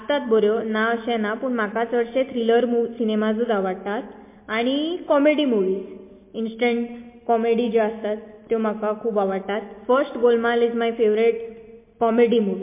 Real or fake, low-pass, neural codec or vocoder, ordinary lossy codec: real; 3.6 kHz; none; MP3, 24 kbps